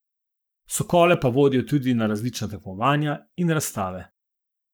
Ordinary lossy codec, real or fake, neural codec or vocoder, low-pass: none; fake; codec, 44.1 kHz, 7.8 kbps, Pupu-Codec; none